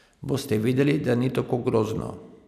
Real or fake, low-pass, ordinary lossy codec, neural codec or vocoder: real; 14.4 kHz; none; none